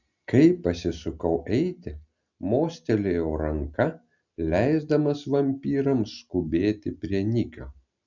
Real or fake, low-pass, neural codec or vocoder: real; 7.2 kHz; none